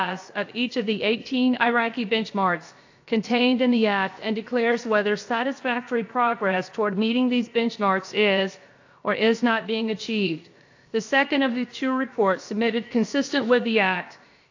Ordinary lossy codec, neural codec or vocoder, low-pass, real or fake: AAC, 48 kbps; codec, 16 kHz, about 1 kbps, DyCAST, with the encoder's durations; 7.2 kHz; fake